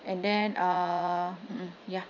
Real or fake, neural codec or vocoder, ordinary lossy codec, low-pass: fake; vocoder, 44.1 kHz, 80 mel bands, Vocos; none; 7.2 kHz